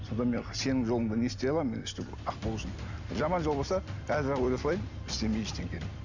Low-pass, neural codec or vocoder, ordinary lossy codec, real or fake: 7.2 kHz; none; none; real